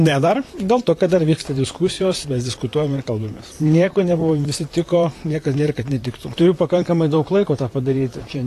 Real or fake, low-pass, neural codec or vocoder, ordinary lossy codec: fake; 14.4 kHz; vocoder, 44.1 kHz, 128 mel bands, Pupu-Vocoder; AAC, 48 kbps